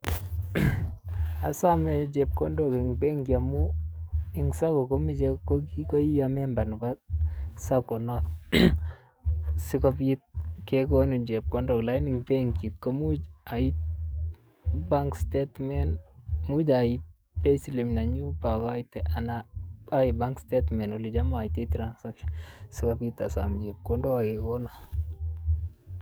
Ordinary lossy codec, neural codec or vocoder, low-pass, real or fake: none; codec, 44.1 kHz, 7.8 kbps, DAC; none; fake